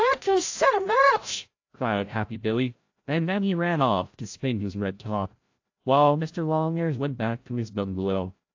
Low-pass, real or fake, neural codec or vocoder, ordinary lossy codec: 7.2 kHz; fake; codec, 16 kHz, 0.5 kbps, FreqCodec, larger model; AAC, 48 kbps